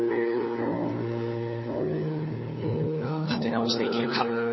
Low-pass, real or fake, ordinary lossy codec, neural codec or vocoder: 7.2 kHz; fake; MP3, 24 kbps; codec, 16 kHz, 4 kbps, FunCodec, trained on LibriTTS, 50 frames a second